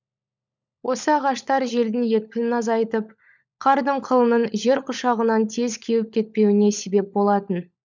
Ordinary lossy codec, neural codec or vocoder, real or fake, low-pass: none; codec, 16 kHz, 16 kbps, FunCodec, trained on LibriTTS, 50 frames a second; fake; 7.2 kHz